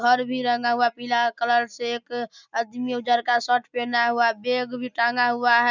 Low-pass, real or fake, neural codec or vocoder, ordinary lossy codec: 7.2 kHz; real; none; none